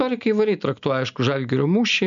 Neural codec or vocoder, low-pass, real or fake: none; 7.2 kHz; real